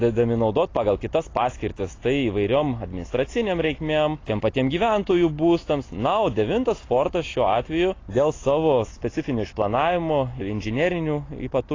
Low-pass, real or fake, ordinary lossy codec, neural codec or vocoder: 7.2 kHz; real; AAC, 32 kbps; none